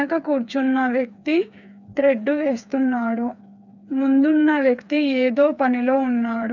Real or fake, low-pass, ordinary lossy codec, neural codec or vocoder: fake; 7.2 kHz; none; codec, 16 kHz, 4 kbps, FreqCodec, smaller model